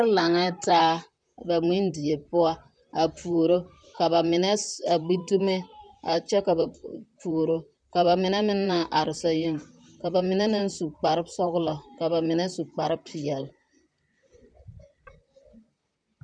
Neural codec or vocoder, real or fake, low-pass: vocoder, 44.1 kHz, 128 mel bands, Pupu-Vocoder; fake; 9.9 kHz